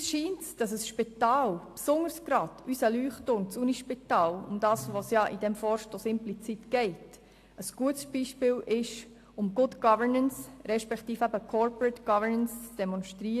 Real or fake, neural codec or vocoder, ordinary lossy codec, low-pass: real; none; AAC, 64 kbps; 14.4 kHz